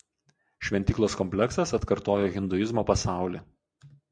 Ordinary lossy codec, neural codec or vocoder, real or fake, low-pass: MP3, 64 kbps; none; real; 9.9 kHz